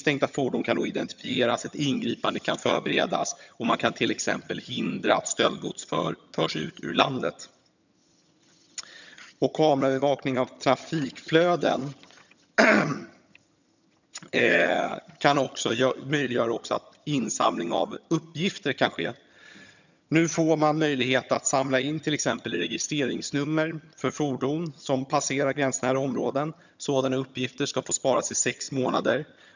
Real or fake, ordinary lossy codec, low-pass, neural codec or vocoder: fake; none; 7.2 kHz; vocoder, 22.05 kHz, 80 mel bands, HiFi-GAN